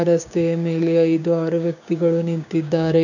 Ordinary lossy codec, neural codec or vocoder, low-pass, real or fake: none; codec, 16 kHz, 6 kbps, DAC; 7.2 kHz; fake